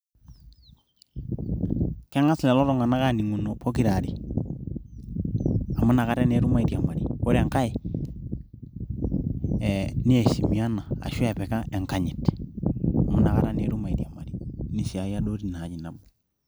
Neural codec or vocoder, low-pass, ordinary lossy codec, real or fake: none; none; none; real